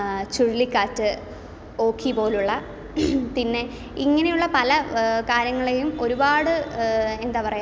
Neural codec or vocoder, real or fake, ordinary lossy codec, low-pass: none; real; none; none